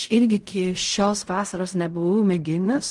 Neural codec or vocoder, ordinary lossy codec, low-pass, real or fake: codec, 16 kHz in and 24 kHz out, 0.4 kbps, LongCat-Audio-Codec, fine tuned four codebook decoder; Opus, 24 kbps; 10.8 kHz; fake